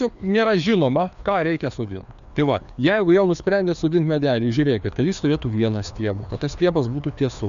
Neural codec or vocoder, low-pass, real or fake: codec, 16 kHz, 2 kbps, FreqCodec, larger model; 7.2 kHz; fake